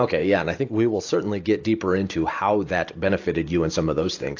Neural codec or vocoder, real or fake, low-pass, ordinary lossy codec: none; real; 7.2 kHz; AAC, 48 kbps